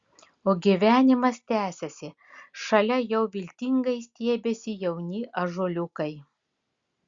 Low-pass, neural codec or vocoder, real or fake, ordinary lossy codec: 7.2 kHz; none; real; Opus, 64 kbps